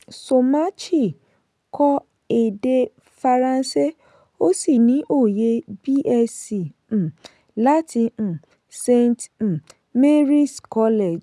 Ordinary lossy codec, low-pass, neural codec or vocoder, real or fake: none; none; none; real